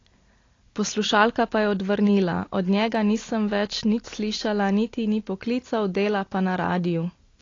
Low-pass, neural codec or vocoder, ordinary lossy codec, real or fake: 7.2 kHz; none; AAC, 32 kbps; real